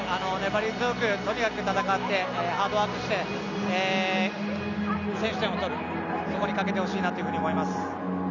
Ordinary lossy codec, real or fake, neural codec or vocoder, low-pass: none; real; none; 7.2 kHz